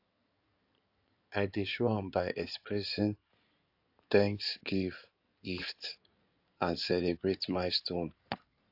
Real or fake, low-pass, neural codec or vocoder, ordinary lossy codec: fake; 5.4 kHz; codec, 16 kHz in and 24 kHz out, 2.2 kbps, FireRedTTS-2 codec; AAC, 48 kbps